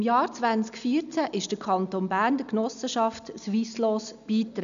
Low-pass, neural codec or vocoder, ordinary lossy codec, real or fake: 7.2 kHz; none; none; real